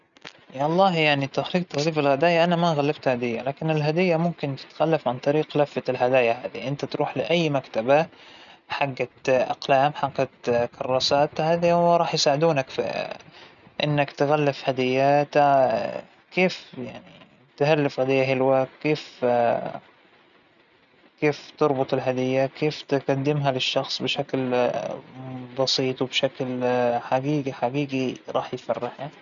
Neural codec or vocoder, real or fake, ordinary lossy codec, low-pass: none; real; none; 7.2 kHz